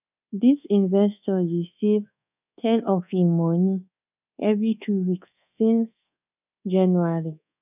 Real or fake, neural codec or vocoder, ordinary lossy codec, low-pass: fake; codec, 24 kHz, 1.2 kbps, DualCodec; none; 3.6 kHz